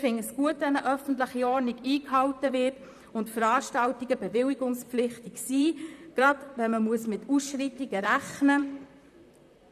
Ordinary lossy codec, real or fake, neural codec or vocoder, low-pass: none; fake; vocoder, 44.1 kHz, 128 mel bands, Pupu-Vocoder; 14.4 kHz